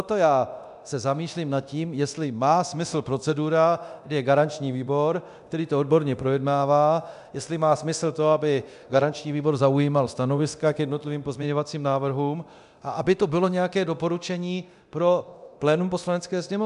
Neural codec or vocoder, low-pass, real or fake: codec, 24 kHz, 0.9 kbps, DualCodec; 10.8 kHz; fake